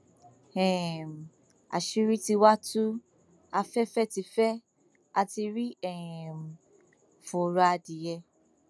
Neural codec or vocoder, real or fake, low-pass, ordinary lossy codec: none; real; none; none